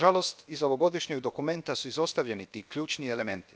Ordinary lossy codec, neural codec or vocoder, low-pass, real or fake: none; codec, 16 kHz, about 1 kbps, DyCAST, with the encoder's durations; none; fake